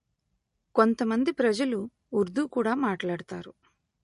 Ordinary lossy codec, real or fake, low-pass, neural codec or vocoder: MP3, 48 kbps; real; 14.4 kHz; none